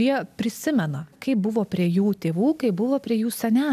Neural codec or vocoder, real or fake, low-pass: none; real; 14.4 kHz